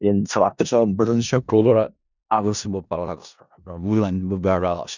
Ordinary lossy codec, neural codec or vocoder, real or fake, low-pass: none; codec, 16 kHz in and 24 kHz out, 0.4 kbps, LongCat-Audio-Codec, four codebook decoder; fake; 7.2 kHz